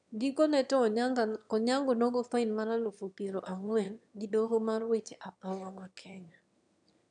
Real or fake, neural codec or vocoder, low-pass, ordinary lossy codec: fake; autoencoder, 22.05 kHz, a latent of 192 numbers a frame, VITS, trained on one speaker; 9.9 kHz; none